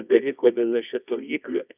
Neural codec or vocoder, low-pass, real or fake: codec, 24 kHz, 0.9 kbps, WavTokenizer, medium music audio release; 3.6 kHz; fake